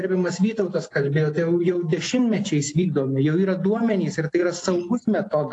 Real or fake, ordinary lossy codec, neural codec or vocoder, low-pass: real; AAC, 48 kbps; none; 10.8 kHz